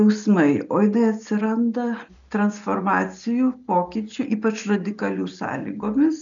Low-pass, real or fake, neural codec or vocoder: 7.2 kHz; real; none